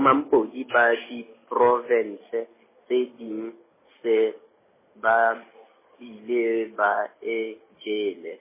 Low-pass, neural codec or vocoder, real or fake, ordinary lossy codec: 3.6 kHz; none; real; MP3, 16 kbps